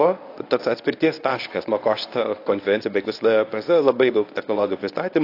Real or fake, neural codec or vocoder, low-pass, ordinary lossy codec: fake; codec, 24 kHz, 0.9 kbps, WavTokenizer, medium speech release version 1; 5.4 kHz; AAC, 32 kbps